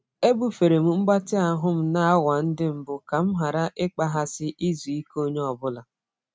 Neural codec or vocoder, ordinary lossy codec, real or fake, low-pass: none; none; real; none